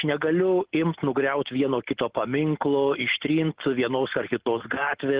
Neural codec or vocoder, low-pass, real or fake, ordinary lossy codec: none; 3.6 kHz; real; Opus, 16 kbps